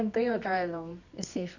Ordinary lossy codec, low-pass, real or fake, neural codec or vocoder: none; 7.2 kHz; fake; codec, 24 kHz, 0.9 kbps, WavTokenizer, medium music audio release